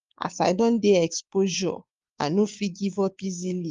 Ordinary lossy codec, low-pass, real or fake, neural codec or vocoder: Opus, 32 kbps; 7.2 kHz; fake; codec, 16 kHz, 6 kbps, DAC